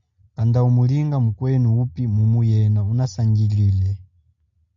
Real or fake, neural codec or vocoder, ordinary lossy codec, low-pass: real; none; MP3, 64 kbps; 7.2 kHz